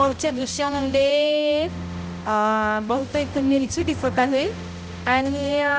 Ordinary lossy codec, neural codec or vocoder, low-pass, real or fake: none; codec, 16 kHz, 0.5 kbps, X-Codec, HuBERT features, trained on balanced general audio; none; fake